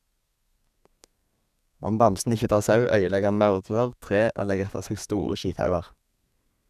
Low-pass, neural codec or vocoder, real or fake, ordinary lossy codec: 14.4 kHz; codec, 32 kHz, 1.9 kbps, SNAC; fake; none